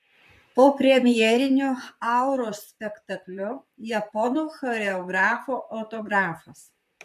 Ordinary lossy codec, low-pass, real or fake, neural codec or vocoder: MP3, 64 kbps; 14.4 kHz; fake; vocoder, 44.1 kHz, 128 mel bands, Pupu-Vocoder